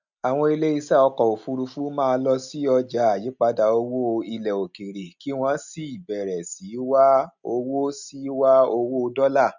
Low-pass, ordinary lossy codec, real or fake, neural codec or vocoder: 7.2 kHz; none; real; none